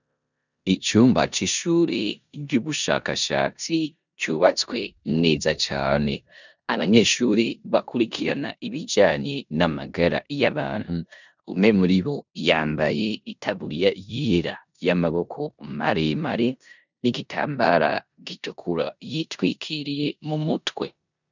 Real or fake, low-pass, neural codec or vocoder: fake; 7.2 kHz; codec, 16 kHz in and 24 kHz out, 0.9 kbps, LongCat-Audio-Codec, four codebook decoder